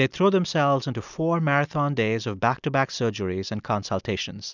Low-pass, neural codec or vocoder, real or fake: 7.2 kHz; none; real